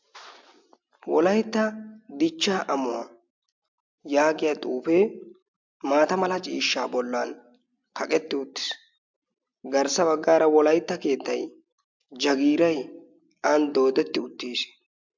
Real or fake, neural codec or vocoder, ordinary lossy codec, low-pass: real; none; MP3, 64 kbps; 7.2 kHz